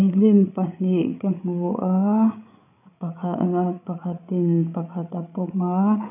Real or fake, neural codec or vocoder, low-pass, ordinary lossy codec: fake; codec, 16 kHz, 8 kbps, FreqCodec, larger model; 3.6 kHz; none